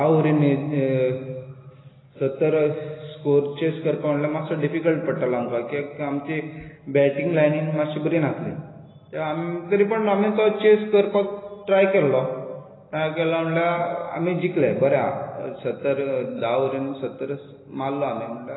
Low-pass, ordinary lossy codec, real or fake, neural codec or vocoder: 7.2 kHz; AAC, 16 kbps; real; none